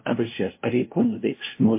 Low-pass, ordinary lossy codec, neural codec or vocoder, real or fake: 3.6 kHz; MP3, 24 kbps; codec, 16 kHz, 0.5 kbps, FunCodec, trained on LibriTTS, 25 frames a second; fake